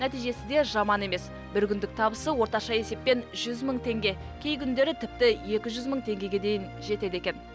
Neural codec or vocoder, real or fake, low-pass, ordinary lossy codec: none; real; none; none